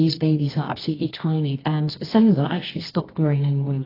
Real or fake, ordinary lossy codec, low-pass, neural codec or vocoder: fake; AAC, 24 kbps; 5.4 kHz; codec, 24 kHz, 0.9 kbps, WavTokenizer, medium music audio release